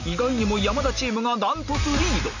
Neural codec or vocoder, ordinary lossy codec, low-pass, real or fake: none; none; 7.2 kHz; real